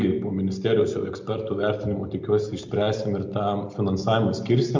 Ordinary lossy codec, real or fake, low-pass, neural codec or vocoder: MP3, 48 kbps; real; 7.2 kHz; none